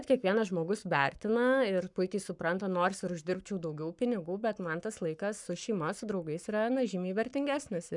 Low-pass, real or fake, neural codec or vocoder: 10.8 kHz; fake; codec, 44.1 kHz, 7.8 kbps, Pupu-Codec